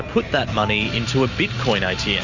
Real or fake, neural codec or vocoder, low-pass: real; none; 7.2 kHz